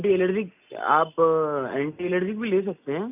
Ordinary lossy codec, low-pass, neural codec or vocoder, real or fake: none; 3.6 kHz; none; real